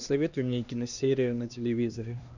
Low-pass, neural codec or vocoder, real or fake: 7.2 kHz; codec, 16 kHz, 2 kbps, X-Codec, HuBERT features, trained on LibriSpeech; fake